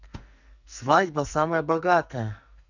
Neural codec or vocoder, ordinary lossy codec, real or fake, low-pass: codec, 44.1 kHz, 2.6 kbps, SNAC; none; fake; 7.2 kHz